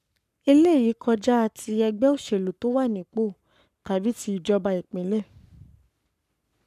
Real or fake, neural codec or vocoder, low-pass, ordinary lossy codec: fake; codec, 44.1 kHz, 7.8 kbps, Pupu-Codec; 14.4 kHz; none